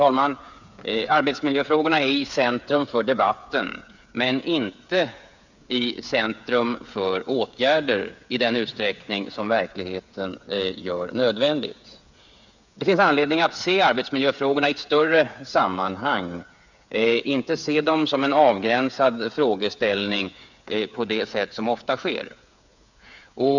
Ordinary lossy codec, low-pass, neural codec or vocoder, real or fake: none; 7.2 kHz; codec, 16 kHz, 8 kbps, FreqCodec, smaller model; fake